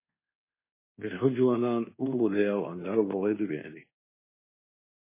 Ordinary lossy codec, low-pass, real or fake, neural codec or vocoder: MP3, 16 kbps; 3.6 kHz; fake; codec, 24 kHz, 1.2 kbps, DualCodec